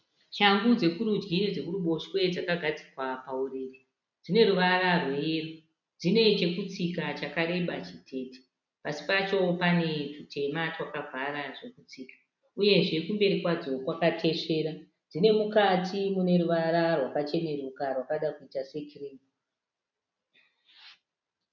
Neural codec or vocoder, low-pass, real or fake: none; 7.2 kHz; real